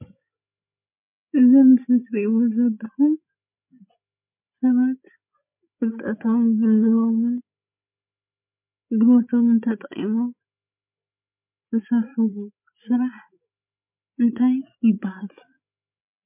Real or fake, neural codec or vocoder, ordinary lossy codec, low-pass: fake; codec, 16 kHz, 8 kbps, FreqCodec, larger model; MP3, 32 kbps; 3.6 kHz